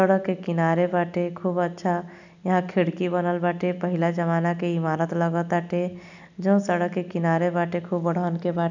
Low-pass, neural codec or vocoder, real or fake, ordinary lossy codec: 7.2 kHz; none; real; none